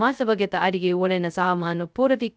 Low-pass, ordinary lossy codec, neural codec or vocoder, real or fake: none; none; codec, 16 kHz, 0.2 kbps, FocalCodec; fake